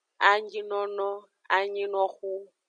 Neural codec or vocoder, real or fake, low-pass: none; real; 9.9 kHz